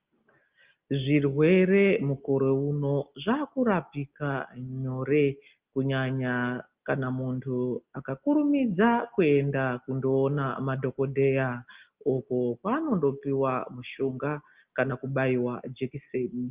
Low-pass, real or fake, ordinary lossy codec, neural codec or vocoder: 3.6 kHz; real; Opus, 32 kbps; none